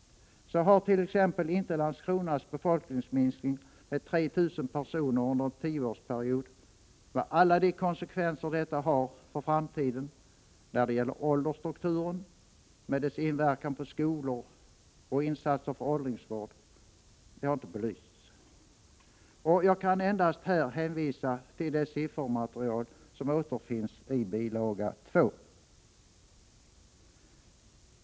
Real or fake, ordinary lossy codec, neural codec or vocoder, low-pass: real; none; none; none